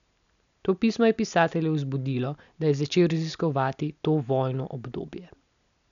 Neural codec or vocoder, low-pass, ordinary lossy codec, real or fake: none; 7.2 kHz; MP3, 96 kbps; real